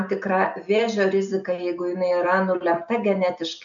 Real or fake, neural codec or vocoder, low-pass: real; none; 7.2 kHz